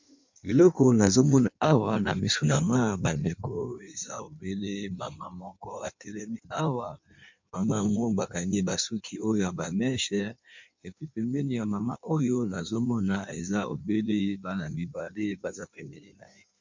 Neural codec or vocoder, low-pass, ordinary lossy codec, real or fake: codec, 16 kHz in and 24 kHz out, 1.1 kbps, FireRedTTS-2 codec; 7.2 kHz; MP3, 64 kbps; fake